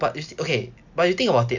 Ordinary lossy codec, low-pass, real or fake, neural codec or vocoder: none; 7.2 kHz; real; none